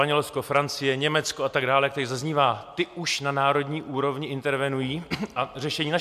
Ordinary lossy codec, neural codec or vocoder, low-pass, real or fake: MP3, 96 kbps; none; 14.4 kHz; real